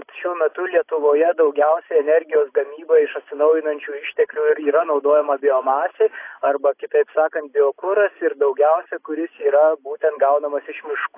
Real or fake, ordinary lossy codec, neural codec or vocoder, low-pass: real; AAC, 24 kbps; none; 3.6 kHz